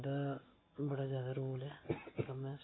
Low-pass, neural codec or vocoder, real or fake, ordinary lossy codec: 7.2 kHz; none; real; AAC, 16 kbps